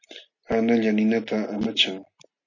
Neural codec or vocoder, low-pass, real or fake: none; 7.2 kHz; real